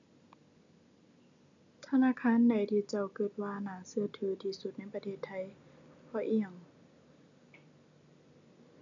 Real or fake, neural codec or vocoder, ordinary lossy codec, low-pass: real; none; none; 7.2 kHz